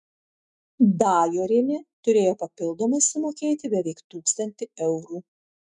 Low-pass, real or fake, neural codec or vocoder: 10.8 kHz; fake; autoencoder, 48 kHz, 128 numbers a frame, DAC-VAE, trained on Japanese speech